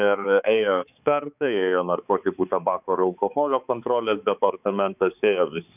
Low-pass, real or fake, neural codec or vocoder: 3.6 kHz; fake; codec, 16 kHz, 4 kbps, X-Codec, HuBERT features, trained on balanced general audio